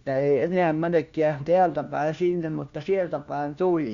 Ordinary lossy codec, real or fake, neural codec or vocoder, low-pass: Opus, 64 kbps; fake; codec, 16 kHz, 1 kbps, FunCodec, trained on LibriTTS, 50 frames a second; 7.2 kHz